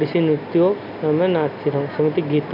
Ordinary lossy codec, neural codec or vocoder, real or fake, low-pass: none; none; real; 5.4 kHz